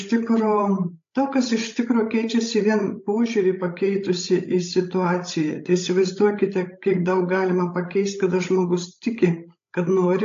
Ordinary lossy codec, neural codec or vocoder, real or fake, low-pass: AAC, 48 kbps; codec, 16 kHz, 16 kbps, FreqCodec, larger model; fake; 7.2 kHz